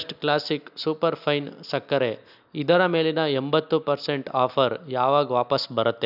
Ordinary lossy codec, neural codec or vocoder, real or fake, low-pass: none; none; real; 5.4 kHz